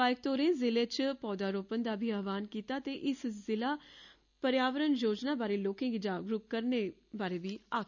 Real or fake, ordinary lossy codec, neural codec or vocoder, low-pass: real; none; none; 7.2 kHz